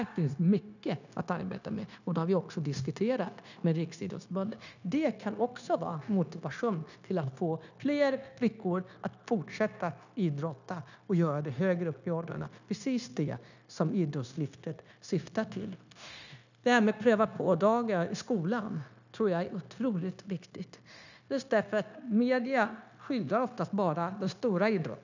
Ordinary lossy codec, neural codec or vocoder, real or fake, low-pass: none; codec, 16 kHz, 0.9 kbps, LongCat-Audio-Codec; fake; 7.2 kHz